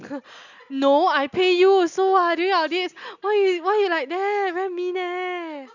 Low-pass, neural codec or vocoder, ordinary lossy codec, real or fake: 7.2 kHz; none; none; real